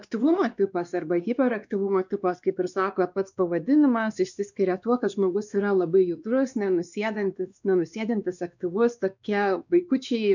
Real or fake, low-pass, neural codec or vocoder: fake; 7.2 kHz; codec, 16 kHz, 2 kbps, X-Codec, WavLM features, trained on Multilingual LibriSpeech